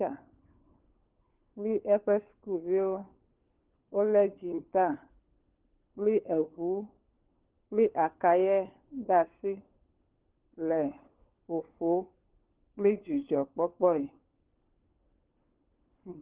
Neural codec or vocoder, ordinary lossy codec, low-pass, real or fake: codec, 16 kHz, 4 kbps, FunCodec, trained on LibriTTS, 50 frames a second; Opus, 16 kbps; 3.6 kHz; fake